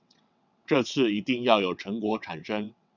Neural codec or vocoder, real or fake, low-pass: vocoder, 22.05 kHz, 80 mel bands, WaveNeXt; fake; 7.2 kHz